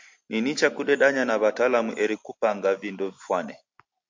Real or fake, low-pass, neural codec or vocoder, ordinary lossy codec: real; 7.2 kHz; none; MP3, 48 kbps